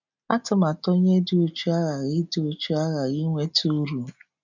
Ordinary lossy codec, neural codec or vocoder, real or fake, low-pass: none; none; real; 7.2 kHz